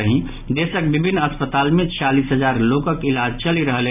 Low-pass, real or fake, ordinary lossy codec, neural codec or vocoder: 3.6 kHz; real; none; none